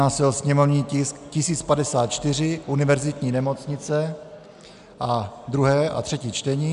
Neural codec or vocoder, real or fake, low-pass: none; real; 10.8 kHz